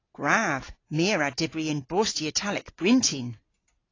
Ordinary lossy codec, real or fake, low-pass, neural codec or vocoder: AAC, 32 kbps; real; 7.2 kHz; none